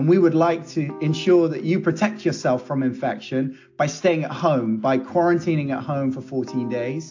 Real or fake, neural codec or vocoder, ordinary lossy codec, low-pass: real; none; AAC, 48 kbps; 7.2 kHz